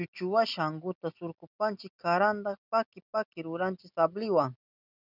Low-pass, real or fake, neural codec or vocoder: 5.4 kHz; real; none